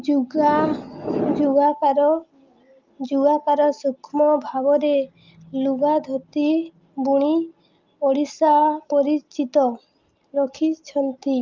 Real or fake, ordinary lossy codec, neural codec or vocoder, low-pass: real; Opus, 24 kbps; none; 7.2 kHz